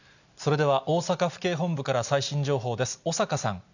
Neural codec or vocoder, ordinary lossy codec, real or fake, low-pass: none; none; real; 7.2 kHz